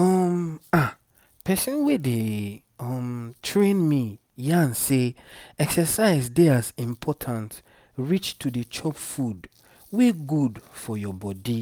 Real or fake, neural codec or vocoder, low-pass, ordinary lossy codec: real; none; none; none